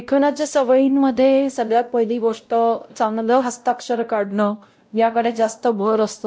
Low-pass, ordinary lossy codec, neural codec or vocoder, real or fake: none; none; codec, 16 kHz, 0.5 kbps, X-Codec, WavLM features, trained on Multilingual LibriSpeech; fake